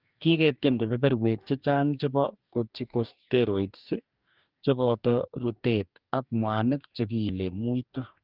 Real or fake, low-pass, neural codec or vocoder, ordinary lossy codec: fake; 5.4 kHz; codec, 44.1 kHz, 2.6 kbps, DAC; Opus, 24 kbps